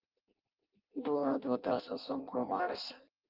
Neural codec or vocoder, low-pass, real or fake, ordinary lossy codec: codec, 16 kHz in and 24 kHz out, 0.6 kbps, FireRedTTS-2 codec; 5.4 kHz; fake; Opus, 32 kbps